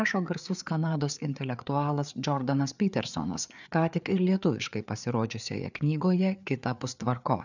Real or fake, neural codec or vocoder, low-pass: fake; codec, 16 kHz, 4 kbps, FreqCodec, larger model; 7.2 kHz